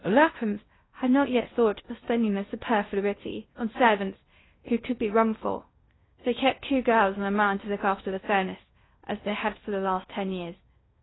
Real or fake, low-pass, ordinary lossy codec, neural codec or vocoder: fake; 7.2 kHz; AAC, 16 kbps; codec, 16 kHz in and 24 kHz out, 0.6 kbps, FocalCodec, streaming, 2048 codes